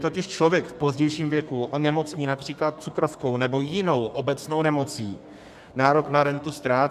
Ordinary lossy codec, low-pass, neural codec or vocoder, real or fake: AAC, 96 kbps; 14.4 kHz; codec, 32 kHz, 1.9 kbps, SNAC; fake